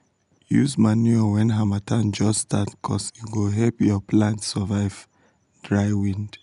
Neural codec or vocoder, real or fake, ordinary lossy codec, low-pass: none; real; none; 10.8 kHz